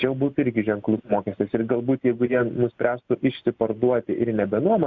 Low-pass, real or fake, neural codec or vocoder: 7.2 kHz; real; none